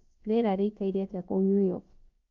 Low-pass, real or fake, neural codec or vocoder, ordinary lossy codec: 7.2 kHz; fake; codec, 16 kHz, about 1 kbps, DyCAST, with the encoder's durations; Opus, 32 kbps